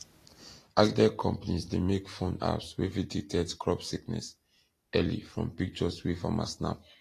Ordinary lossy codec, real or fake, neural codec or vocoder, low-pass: AAC, 48 kbps; real; none; 14.4 kHz